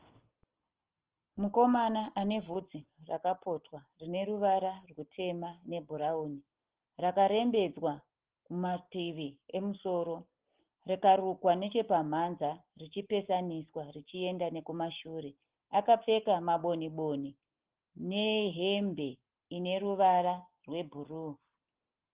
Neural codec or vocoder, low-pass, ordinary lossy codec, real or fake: none; 3.6 kHz; Opus, 16 kbps; real